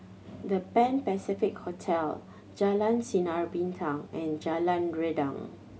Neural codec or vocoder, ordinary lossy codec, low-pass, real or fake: none; none; none; real